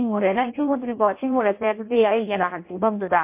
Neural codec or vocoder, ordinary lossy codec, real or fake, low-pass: codec, 16 kHz in and 24 kHz out, 0.6 kbps, FireRedTTS-2 codec; MP3, 24 kbps; fake; 3.6 kHz